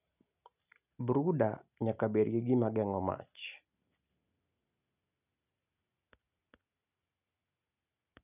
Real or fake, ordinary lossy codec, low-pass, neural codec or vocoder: real; none; 3.6 kHz; none